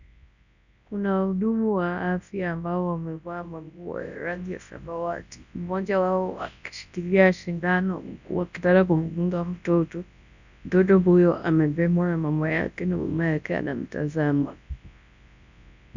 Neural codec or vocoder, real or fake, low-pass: codec, 24 kHz, 0.9 kbps, WavTokenizer, large speech release; fake; 7.2 kHz